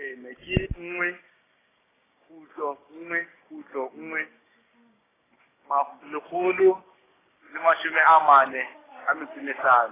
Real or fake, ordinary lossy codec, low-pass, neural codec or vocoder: fake; AAC, 16 kbps; 3.6 kHz; codec, 16 kHz, 6 kbps, DAC